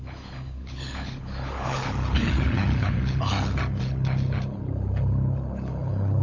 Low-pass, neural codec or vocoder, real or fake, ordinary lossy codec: 7.2 kHz; codec, 16 kHz, 16 kbps, FunCodec, trained on LibriTTS, 50 frames a second; fake; AAC, 48 kbps